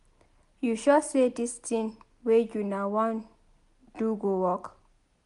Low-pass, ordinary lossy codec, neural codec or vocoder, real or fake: 10.8 kHz; none; none; real